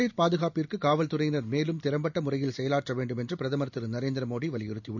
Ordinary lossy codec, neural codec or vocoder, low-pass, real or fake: none; none; 7.2 kHz; real